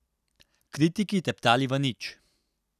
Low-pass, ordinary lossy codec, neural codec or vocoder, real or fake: 14.4 kHz; none; none; real